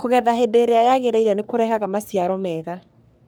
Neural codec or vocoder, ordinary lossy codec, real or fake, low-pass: codec, 44.1 kHz, 3.4 kbps, Pupu-Codec; none; fake; none